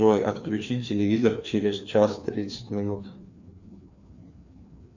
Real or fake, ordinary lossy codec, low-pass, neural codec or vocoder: fake; Opus, 64 kbps; 7.2 kHz; codec, 16 kHz, 2 kbps, FreqCodec, larger model